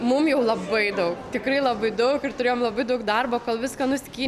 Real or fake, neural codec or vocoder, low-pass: real; none; 14.4 kHz